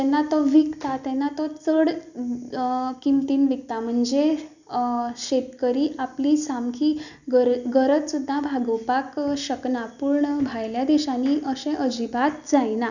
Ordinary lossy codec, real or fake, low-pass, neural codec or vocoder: none; real; 7.2 kHz; none